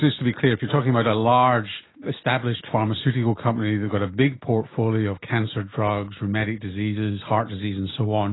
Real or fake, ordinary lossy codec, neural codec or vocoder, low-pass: real; AAC, 16 kbps; none; 7.2 kHz